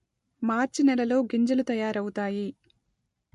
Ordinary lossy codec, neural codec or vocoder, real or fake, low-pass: MP3, 48 kbps; none; real; 14.4 kHz